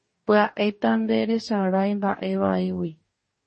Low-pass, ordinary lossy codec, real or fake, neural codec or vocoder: 10.8 kHz; MP3, 32 kbps; fake; codec, 44.1 kHz, 2.6 kbps, DAC